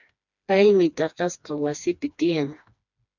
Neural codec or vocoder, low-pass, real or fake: codec, 16 kHz, 2 kbps, FreqCodec, smaller model; 7.2 kHz; fake